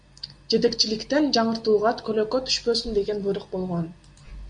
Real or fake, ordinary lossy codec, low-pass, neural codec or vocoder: real; MP3, 64 kbps; 9.9 kHz; none